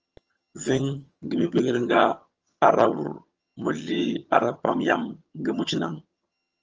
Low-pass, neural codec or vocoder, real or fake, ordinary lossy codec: 7.2 kHz; vocoder, 22.05 kHz, 80 mel bands, HiFi-GAN; fake; Opus, 24 kbps